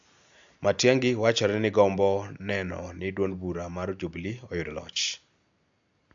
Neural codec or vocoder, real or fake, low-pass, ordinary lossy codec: none; real; 7.2 kHz; AAC, 64 kbps